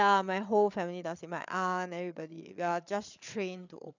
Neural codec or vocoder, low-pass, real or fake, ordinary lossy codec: codec, 16 kHz, 8 kbps, FreqCodec, larger model; 7.2 kHz; fake; none